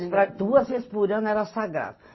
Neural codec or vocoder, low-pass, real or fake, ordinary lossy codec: none; 7.2 kHz; real; MP3, 24 kbps